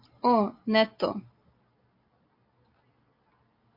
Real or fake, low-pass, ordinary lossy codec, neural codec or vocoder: real; 5.4 kHz; MP3, 32 kbps; none